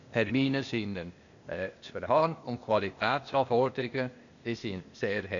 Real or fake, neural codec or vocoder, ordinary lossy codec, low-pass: fake; codec, 16 kHz, 0.8 kbps, ZipCodec; none; 7.2 kHz